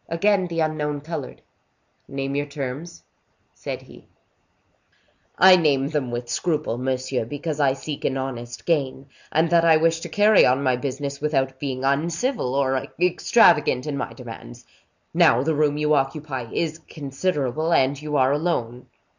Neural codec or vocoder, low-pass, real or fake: none; 7.2 kHz; real